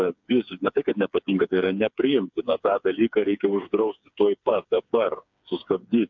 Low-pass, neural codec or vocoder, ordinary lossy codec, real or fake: 7.2 kHz; codec, 16 kHz, 4 kbps, FreqCodec, smaller model; MP3, 64 kbps; fake